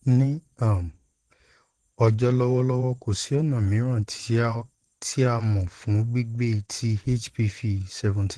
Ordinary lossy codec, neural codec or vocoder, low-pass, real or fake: Opus, 16 kbps; vocoder, 22.05 kHz, 80 mel bands, Vocos; 9.9 kHz; fake